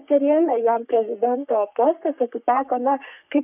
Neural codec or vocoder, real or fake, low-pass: codec, 44.1 kHz, 3.4 kbps, Pupu-Codec; fake; 3.6 kHz